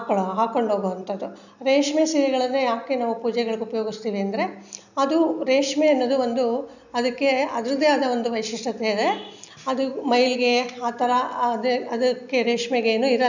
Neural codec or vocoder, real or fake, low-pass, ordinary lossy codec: none; real; 7.2 kHz; none